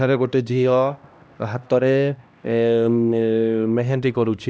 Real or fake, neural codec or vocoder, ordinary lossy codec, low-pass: fake; codec, 16 kHz, 1 kbps, X-Codec, HuBERT features, trained on LibriSpeech; none; none